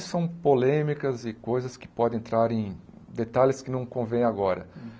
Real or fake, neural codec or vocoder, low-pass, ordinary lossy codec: real; none; none; none